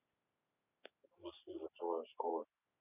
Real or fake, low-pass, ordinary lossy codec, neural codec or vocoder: real; 3.6 kHz; none; none